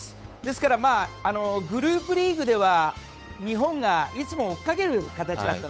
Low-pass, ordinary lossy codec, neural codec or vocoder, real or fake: none; none; codec, 16 kHz, 8 kbps, FunCodec, trained on Chinese and English, 25 frames a second; fake